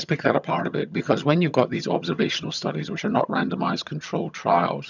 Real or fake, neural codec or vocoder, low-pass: fake; vocoder, 22.05 kHz, 80 mel bands, HiFi-GAN; 7.2 kHz